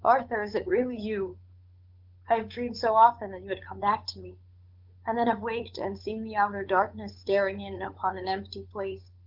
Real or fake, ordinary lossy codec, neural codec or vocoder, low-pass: fake; Opus, 32 kbps; codec, 16 kHz, 8 kbps, FunCodec, trained on Chinese and English, 25 frames a second; 5.4 kHz